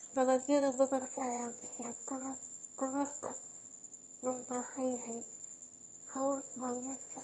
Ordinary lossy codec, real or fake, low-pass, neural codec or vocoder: MP3, 48 kbps; fake; 9.9 kHz; autoencoder, 22.05 kHz, a latent of 192 numbers a frame, VITS, trained on one speaker